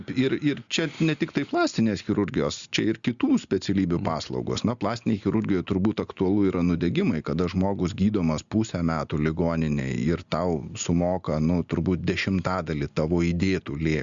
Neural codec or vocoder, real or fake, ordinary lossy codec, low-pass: none; real; Opus, 64 kbps; 7.2 kHz